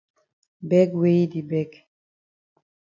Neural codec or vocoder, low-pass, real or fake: none; 7.2 kHz; real